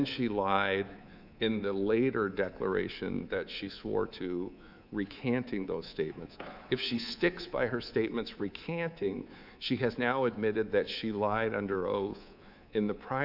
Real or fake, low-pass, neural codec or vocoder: fake; 5.4 kHz; codec, 24 kHz, 3.1 kbps, DualCodec